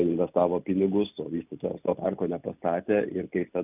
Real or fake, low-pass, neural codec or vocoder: real; 3.6 kHz; none